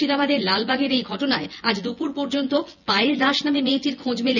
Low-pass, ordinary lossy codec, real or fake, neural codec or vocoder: 7.2 kHz; none; fake; vocoder, 24 kHz, 100 mel bands, Vocos